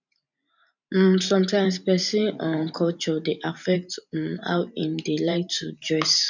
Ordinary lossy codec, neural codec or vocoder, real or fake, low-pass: none; vocoder, 44.1 kHz, 128 mel bands every 512 samples, BigVGAN v2; fake; 7.2 kHz